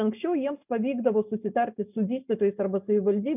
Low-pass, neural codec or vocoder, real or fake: 3.6 kHz; none; real